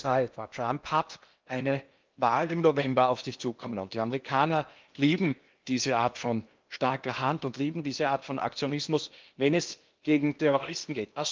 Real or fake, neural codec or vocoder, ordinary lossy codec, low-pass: fake; codec, 16 kHz in and 24 kHz out, 0.8 kbps, FocalCodec, streaming, 65536 codes; Opus, 24 kbps; 7.2 kHz